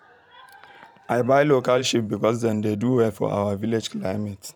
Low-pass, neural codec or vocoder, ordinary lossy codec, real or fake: 19.8 kHz; vocoder, 44.1 kHz, 128 mel bands every 512 samples, BigVGAN v2; none; fake